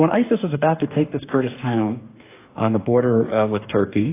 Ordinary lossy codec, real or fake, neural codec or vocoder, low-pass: AAC, 24 kbps; fake; codec, 44.1 kHz, 2.6 kbps, DAC; 3.6 kHz